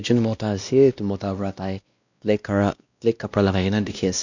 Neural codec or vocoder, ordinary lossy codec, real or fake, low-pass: codec, 16 kHz, 1 kbps, X-Codec, WavLM features, trained on Multilingual LibriSpeech; none; fake; 7.2 kHz